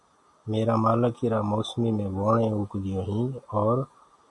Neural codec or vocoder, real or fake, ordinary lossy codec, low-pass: none; real; AAC, 64 kbps; 10.8 kHz